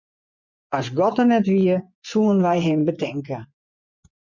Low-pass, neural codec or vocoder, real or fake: 7.2 kHz; vocoder, 24 kHz, 100 mel bands, Vocos; fake